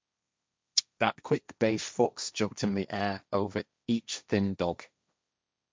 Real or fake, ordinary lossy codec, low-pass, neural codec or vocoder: fake; none; none; codec, 16 kHz, 1.1 kbps, Voila-Tokenizer